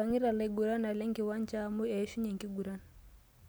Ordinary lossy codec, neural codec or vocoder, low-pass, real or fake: none; none; none; real